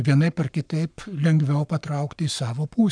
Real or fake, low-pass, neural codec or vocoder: fake; 9.9 kHz; codec, 44.1 kHz, 7.8 kbps, Pupu-Codec